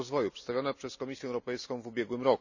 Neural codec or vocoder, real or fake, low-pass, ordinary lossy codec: none; real; 7.2 kHz; none